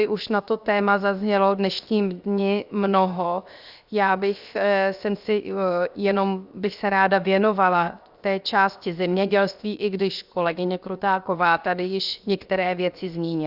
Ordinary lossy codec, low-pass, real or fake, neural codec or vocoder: Opus, 64 kbps; 5.4 kHz; fake; codec, 16 kHz, 0.7 kbps, FocalCodec